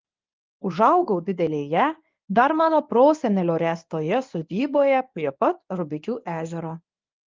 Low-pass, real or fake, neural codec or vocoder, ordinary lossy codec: 7.2 kHz; fake; codec, 24 kHz, 0.9 kbps, WavTokenizer, medium speech release version 1; Opus, 24 kbps